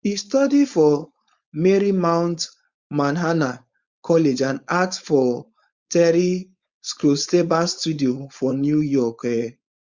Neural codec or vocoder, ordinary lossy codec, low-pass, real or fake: codec, 16 kHz, 4.8 kbps, FACodec; Opus, 64 kbps; 7.2 kHz; fake